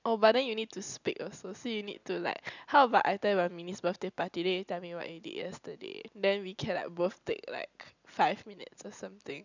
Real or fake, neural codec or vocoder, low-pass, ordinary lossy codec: real; none; 7.2 kHz; none